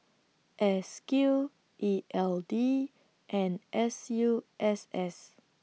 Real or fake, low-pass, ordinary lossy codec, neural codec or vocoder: real; none; none; none